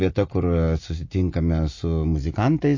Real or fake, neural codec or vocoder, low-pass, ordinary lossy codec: real; none; 7.2 kHz; MP3, 32 kbps